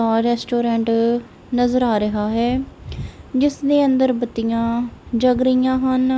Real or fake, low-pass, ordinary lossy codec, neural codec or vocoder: real; none; none; none